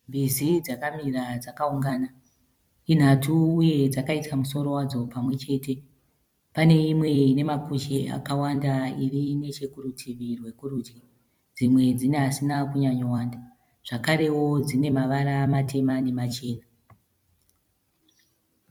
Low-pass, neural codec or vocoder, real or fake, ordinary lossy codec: 19.8 kHz; vocoder, 44.1 kHz, 128 mel bands every 256 samples, BigVGAN v2; fake; MP3, 96 kbps